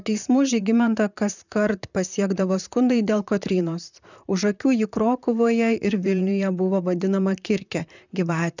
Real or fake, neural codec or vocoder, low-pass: fake; vocoder, 44.1 kHz, 128 mel bands, Pupu-Vocoder; 7.2 kHz